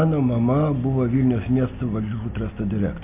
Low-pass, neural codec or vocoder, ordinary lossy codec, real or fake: 3.6 kHz; none; MP3, 32 kbps; real